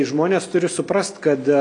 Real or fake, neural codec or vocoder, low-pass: real; none; 9.9 kHz